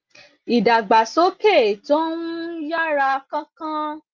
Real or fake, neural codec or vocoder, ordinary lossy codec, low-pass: real; none; Opus, 24 kbps; 7.2 kHz